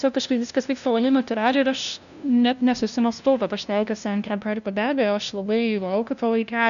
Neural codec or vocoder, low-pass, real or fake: codec, 16 kHz, 0.5 kbps, FunCodec, trained on LibriTTS, 25 frames a second; 7.2 kHz; fake